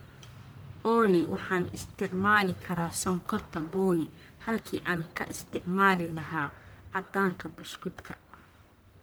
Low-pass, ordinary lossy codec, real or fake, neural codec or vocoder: none; none; fake; codec, 44.1 kHz, 1.7 kbps, Pupu-Codec